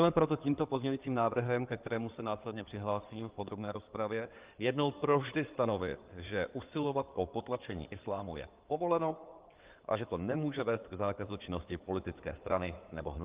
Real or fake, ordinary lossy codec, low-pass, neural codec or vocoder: fake; Opus, 32 kbps; 3.6 kHz; codec, 16 kHz in and 24 kHz out, 2.2 kbps, FireRedTTS-2 codec